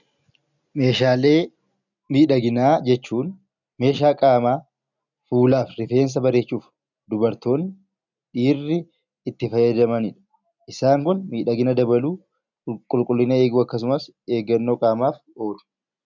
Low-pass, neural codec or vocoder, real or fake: 7.2 kHz; none; real